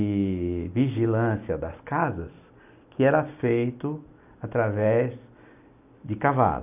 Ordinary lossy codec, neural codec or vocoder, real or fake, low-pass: none; none; real; 3.6 kHz